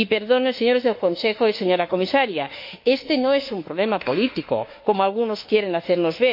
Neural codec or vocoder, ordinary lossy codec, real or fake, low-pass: autoencoder, 48 kHz, 32 numbers a frame, DAC-VAE, trained on Japanese speech; MP3, 32 kbps; fake; 5.4 kHz